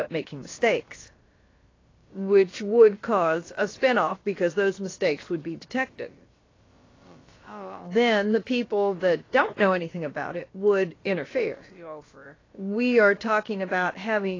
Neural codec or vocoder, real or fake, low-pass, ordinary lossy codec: codec, 16 kHz, about 1 kbps, DyCAST, with the encoder's durations; fake; 7.2 kHz; AAC, 32 kbps